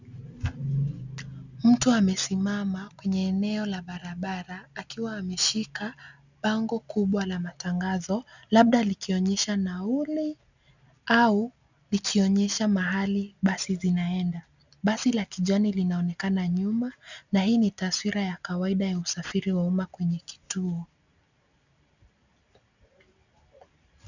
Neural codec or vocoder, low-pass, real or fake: none; 7.2 kHz; real